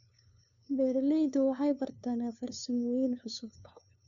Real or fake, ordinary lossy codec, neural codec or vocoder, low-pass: fake; none; codec, 16 kHz, 2 kbps, FunCodec, trained on LibriTTS, 25 frames a second; 7.2 kHz